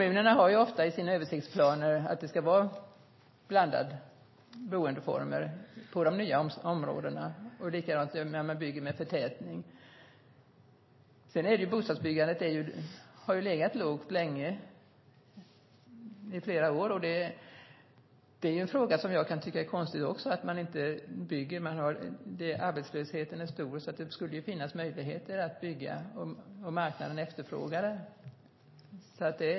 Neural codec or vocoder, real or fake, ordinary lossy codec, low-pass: none; real; MP3, 24 kbps; 7.2 kHz